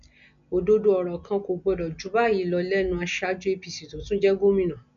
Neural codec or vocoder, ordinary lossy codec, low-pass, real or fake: none; none; 7.2 kHz; real